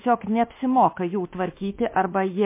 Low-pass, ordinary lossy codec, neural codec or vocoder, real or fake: 3.6 kHz; MP3, 24 kbps; autoencoder, 48 kHz, 32 numbers a frame, DAC-VAE, trained on Japanese speech; fake